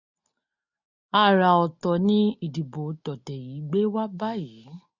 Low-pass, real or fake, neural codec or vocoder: 7.2 kHz; real; none